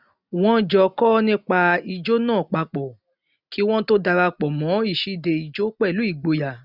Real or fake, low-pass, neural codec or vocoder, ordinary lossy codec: real; 5.4 kHz; none; none